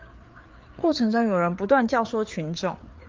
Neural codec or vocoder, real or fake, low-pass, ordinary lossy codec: codec, 16 kHz, 4 kbps, FreqCodec, larger model; fake; 7.2 kHz; Opus, 24 kbps